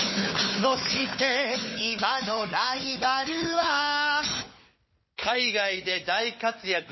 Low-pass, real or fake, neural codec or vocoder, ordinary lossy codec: 7.2 kHz; fake; codec, 16 kHz, 4 kbps, FunCodec, trained on Chinese and English, 50 frames a second; MP3, 24 kbps